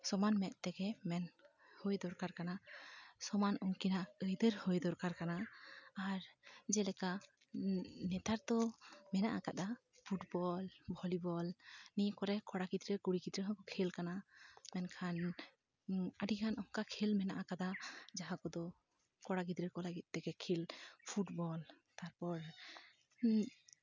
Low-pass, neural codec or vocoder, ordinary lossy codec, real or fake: 7.2 kHz; none; none; real